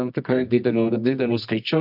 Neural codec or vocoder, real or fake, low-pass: codec, 24 kHz, 0.9 kbps, WavTokenizer, medium music audio release; fake; 5.4 kHz